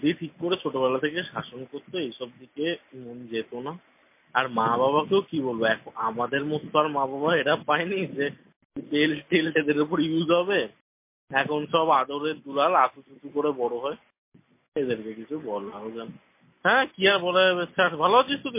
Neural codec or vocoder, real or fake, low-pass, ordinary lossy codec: none; real; 3.6 kHz; MP3, 24 kbps